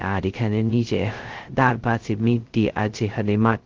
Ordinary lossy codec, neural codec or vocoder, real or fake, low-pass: Opus, 16 kbps; codec, 16 kHz, 0.2 kbps, FocalCodec; fake; 7.2 kHz